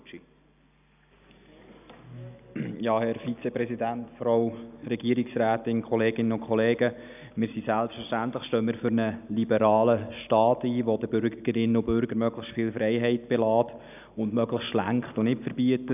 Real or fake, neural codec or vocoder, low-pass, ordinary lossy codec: real; none; 3.6 kHz; none